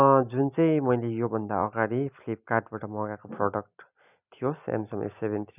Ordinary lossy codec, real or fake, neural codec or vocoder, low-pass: none; real; none; 3.6 kHz